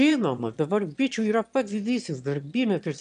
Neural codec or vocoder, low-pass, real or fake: autoencoder, 22.05 kHz, a latent of 192 numbers a frame, VITS, trained on one speaker; 9.9 kHz; fake